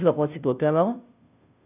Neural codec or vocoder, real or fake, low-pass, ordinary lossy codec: codec, 16 kHz, 0.5 kbps, FunCodec, trained on Chinese and English, 25 frames a second; fake; 3.6 kHz; none